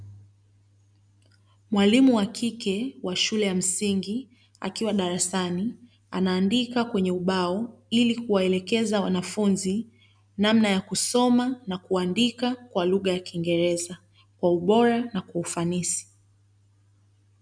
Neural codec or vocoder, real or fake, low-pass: none; real; 9.9 kHz